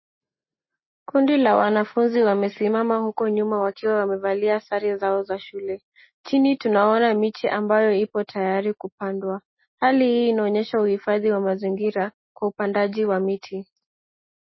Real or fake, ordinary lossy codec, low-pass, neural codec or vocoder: real; MP3, 24 kbps; 7.2 kHz; none